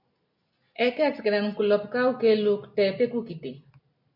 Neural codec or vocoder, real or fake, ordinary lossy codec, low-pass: none; real; MP3, 48 kbps; 5.4 kHz